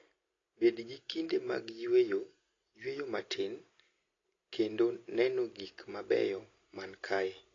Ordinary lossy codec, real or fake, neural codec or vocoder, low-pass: AAC, 32 kbps; real; none; 7.2 kHz